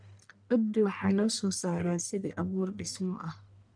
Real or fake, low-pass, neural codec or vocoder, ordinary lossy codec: fake; 9.9 kHz; codec, 44.1 kHz, 1.7 kbps, Pupu-Codec; none